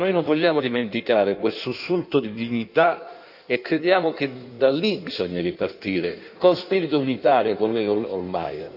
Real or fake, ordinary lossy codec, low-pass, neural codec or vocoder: fake; none; 5.4 kHz; codec, 16 kHz in and 24 kHz out, 1.1 kbps, FireRedTTS-2 codec